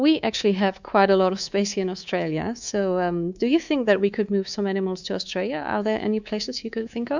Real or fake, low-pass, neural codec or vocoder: fake; 7.2 kHz; codec, 24 kHz, 3.1 kbps, DualCodec